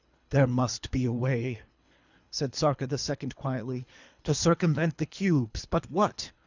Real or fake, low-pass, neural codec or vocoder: fake; 7.2 kHz; codec, 24 kHz, 3 kbps, HILCodec